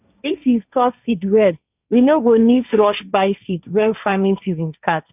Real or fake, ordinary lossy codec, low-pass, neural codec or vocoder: fake; none; 3.6 kHz; codec, 16 kHz, 1.1 kbps, Voila-Tokenizer